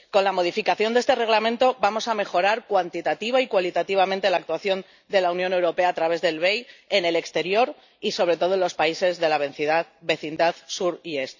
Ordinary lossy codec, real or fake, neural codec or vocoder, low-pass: none; real; none; 7.2 kHz